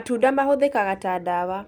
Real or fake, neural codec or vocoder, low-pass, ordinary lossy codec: real; none; 19.8 kHz; none